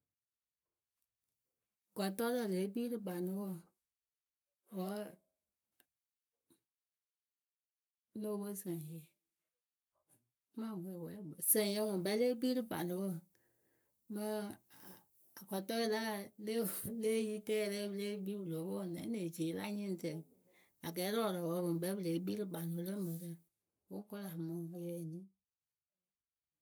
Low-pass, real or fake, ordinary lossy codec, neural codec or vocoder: none; fake; none; codec, 44.1 kHz, 7.8 kbps, Pupu-Codec